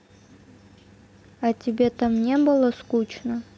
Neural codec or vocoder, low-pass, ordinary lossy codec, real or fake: none; none; none; real